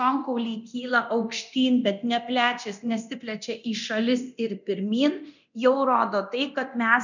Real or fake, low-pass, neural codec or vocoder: fake; 7.2 kHz; codec, 24 kHz, 0.9 kbps, DualCodec